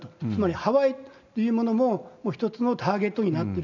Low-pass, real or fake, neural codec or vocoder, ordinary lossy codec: 7.2 kHz; real; none; none